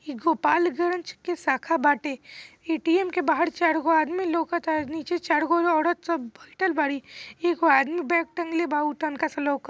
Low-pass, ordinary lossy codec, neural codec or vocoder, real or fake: none; none; none; real